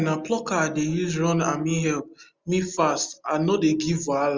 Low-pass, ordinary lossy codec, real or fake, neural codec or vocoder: 7.2 kHz; Opus, 32 kbps; real; none